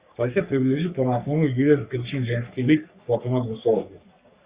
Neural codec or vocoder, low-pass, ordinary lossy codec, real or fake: codec, 44.1 kHz, 3.4 kbps, Pupu-Codec; 3.6 kHz; Opus, 64 kbps; fake